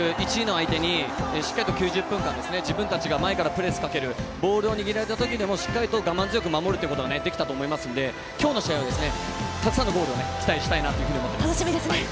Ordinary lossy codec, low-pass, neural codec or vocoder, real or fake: none; none; none; real